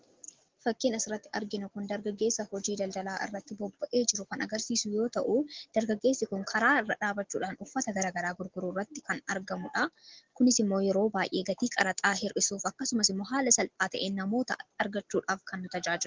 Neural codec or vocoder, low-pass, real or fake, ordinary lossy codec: none; 7.2 kHz; real; Opus, 16 kbps